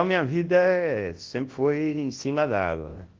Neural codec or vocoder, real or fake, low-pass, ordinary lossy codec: codec, 24 kHz, 0.9 kbps, WavTokenizer, large speech release; fake; 7.2 kHz; Opus, 16 kbps